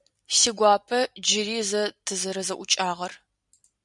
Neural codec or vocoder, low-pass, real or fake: vocoder, 44.1 kHz, 128 mel bands every 256 samples, BigVGAN v2; 10.8 kHz; fake